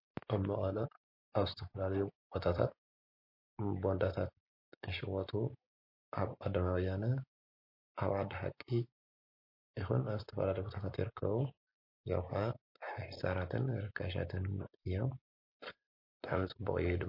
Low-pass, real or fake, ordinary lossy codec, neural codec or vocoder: 5.4 kHz; real; MP3, 32 kbps; none